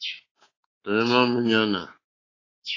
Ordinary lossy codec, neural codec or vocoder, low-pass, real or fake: AAC, 32 kbps; codec, 24 kHz, 3.1 kbps, DualCodec; 7.2 kHz; fake